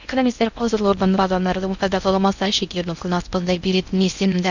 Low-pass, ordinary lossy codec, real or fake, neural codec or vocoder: 7.2 kHz; none; fake; codec, 16 kHz in and 24 kHz out, 0.6 kbps, FocalCodec, streaming, 2048 codes